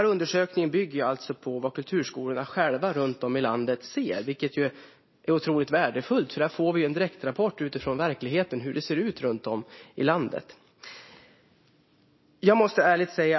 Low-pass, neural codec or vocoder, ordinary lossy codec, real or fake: 7.2 kHz; none; MP3, 24 kbps; real